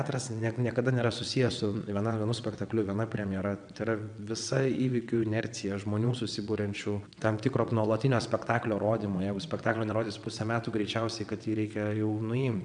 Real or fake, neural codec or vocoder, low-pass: fake; vocoder, 22.05 kHz, 80 mel bands, WaveNeXt; 9.9 kHz